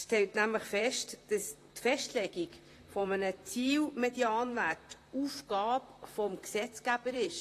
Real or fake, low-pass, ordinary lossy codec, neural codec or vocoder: fake; 14.4 kHz; AAC, 48 kbps; vocoder, 44.1 kHz, 128 mel bands, Pupu-Vocoder